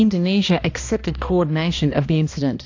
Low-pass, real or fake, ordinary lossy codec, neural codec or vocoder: 7.2 kHz; fake; AAC, 48 kbps; codec, 16 kHz, 1 kbps, X-Codec, HuBERT features, trained on balanced general audio